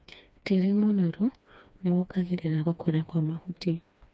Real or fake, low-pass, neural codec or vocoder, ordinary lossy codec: fake; none; codec, 16 kHz, 2 kbps, FreqCodec, smaller model; none